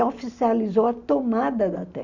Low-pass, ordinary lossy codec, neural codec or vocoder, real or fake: 7.2 kHz; Opus, 64 kbps; none; real